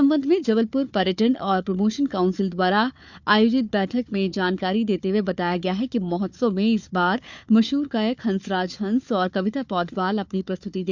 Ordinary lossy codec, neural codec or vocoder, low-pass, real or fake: none; codec, 16 kHz, 4 kbps, FunCodec, trained on Chinese and English, 50 frames a second; 7.2 kHz; fake